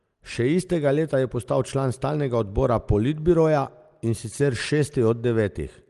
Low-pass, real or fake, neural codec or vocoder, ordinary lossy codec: 10.8 kHz; real; none; Opus, 24 kbps